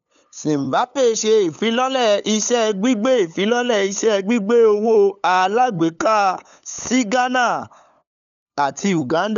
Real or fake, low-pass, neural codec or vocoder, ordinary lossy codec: fake; 7.2 kHz; codec, 16 kHz, 8 kbps, FunCodec, trained on LibriTTS, 25 frames a second; none